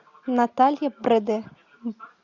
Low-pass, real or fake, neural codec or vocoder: 7.2 kHz; real; none